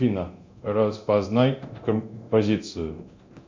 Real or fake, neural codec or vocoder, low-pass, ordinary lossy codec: fake; codec, 24 kHz, 0.9 kbps, DualCodec; 7.2 kHz; MP3, 64 kbps